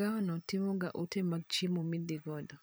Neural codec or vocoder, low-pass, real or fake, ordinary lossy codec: vocoder, 44.1 kHz, 128 mel bands every 256 samples, BigVGAN v2; none; fake; none